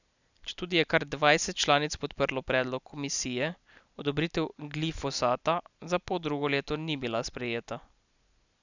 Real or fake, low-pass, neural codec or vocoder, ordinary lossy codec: real; 7.2 kHz; none; none